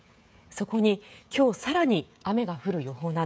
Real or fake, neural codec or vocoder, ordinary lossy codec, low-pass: fake; codec, 16 kHz, 16 kbps, FreqCodec, smaller model; none; none